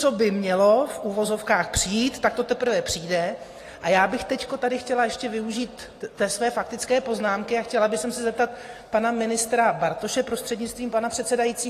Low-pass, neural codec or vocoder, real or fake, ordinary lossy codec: 14.4 kHz; none; real; AAC, 48 kbps